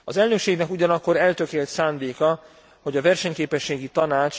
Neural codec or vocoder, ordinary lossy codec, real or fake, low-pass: none; none; real; none